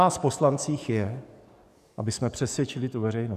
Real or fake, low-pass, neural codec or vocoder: fake; 14.4 kHz; codec, 44.1 kHz, 7.8 kbps, DAC